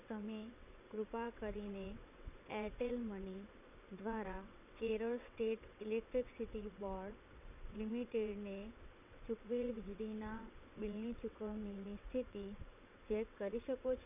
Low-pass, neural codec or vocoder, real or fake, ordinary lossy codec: 3.6 kHz; vocoder, 22.05 kHz, 80 mel bands, WaveNeXt; fake; none